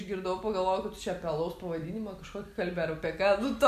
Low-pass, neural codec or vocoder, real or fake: 14.4 kHz; none; real